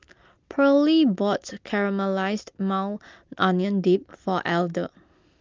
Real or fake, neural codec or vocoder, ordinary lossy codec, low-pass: real; none; Opus, 32 kbps; 7.2 kHz